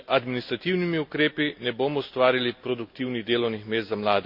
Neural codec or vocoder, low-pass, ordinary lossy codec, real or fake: none; 5.4 kHz; MP3, 48 kbps; real